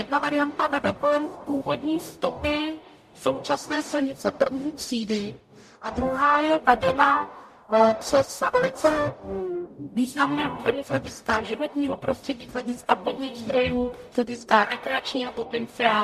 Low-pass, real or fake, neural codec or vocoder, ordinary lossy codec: 14.4 kHz; fake; codec, 44.1 kHz, 0.9 kbps, DAC; MP3, 64 kbps